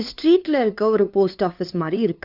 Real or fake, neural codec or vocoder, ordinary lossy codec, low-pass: fake; codec, 16 kHz, 2 kbps, FunCodec, trained on LibriTTS, 25 frames a second; Opus, 64 kbps; 5.4 kHz